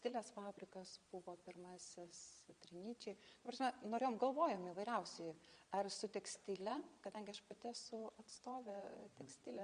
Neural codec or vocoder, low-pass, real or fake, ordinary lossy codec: vocoder, 22.05 kHz, 80 mel bands, Vocos; 9.9 kHz; fake; Opus, 64 kbps